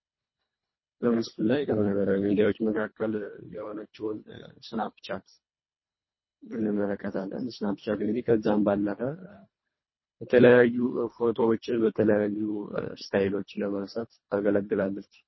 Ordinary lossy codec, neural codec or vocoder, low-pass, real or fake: MP3, 24 kbps; codec, 24 kHz, 1.5 kbps, HILCodec; 7.2 kHz; fake